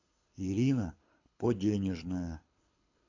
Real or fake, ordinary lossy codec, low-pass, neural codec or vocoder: fake; AAC, 48 kbps; 7.2 kHz; codec, 24 kHz, 6 kbps, HILCodec